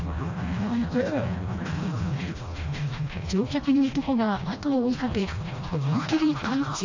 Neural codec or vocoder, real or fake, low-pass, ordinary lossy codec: codec, 16 kHz, 1 kbps, FreqCodec, smaller model; fake; 7.2 kHz; AAC, 48 kbps